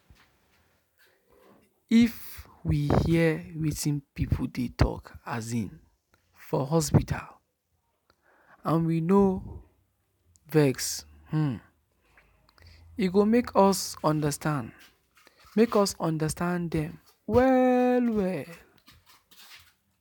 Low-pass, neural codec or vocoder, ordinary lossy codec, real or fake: none; none; none; real